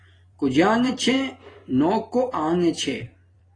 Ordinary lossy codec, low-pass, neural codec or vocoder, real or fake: AAC, 32 kbps; 9.9 kHz; vocoder, 44.1 kHz, 128 mel bands every 256 samples, BigVGAN v2; fake